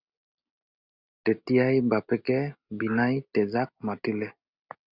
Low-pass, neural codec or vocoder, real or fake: 5.4 kHz; none; real